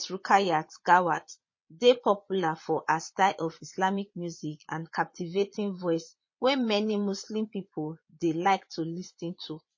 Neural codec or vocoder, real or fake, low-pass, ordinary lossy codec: vocoder, 22.05 kHz, 80 mel bands, Vocos; fake; 7.2 kHz; MP3, 32 kbps